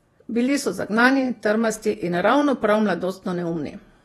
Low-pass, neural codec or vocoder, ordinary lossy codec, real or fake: 19.8 kHz; vocoder, 48 kHz, 128 mel bands, Vocos; AAC, 32 kbps; fake